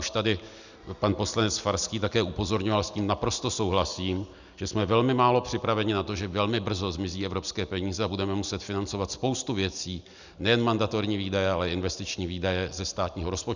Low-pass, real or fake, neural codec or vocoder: 7.2 kHz; real; none